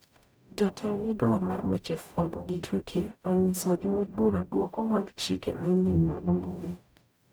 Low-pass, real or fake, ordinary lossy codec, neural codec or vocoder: none; fake; none; codec, 44.1 kHz, 0.9 kbps, DAC